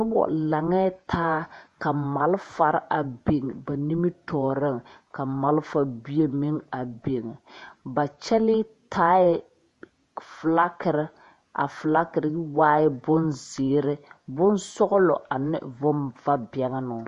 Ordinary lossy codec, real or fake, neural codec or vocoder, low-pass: MP3, 48 kbps; fake; vocoder, 48 kHz, 128 mel bands, Vocos; 14.4 kHz